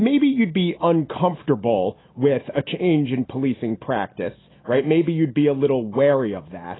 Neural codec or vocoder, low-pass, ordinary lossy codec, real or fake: none; 7.2 kHz; AAC, 16 kbps; real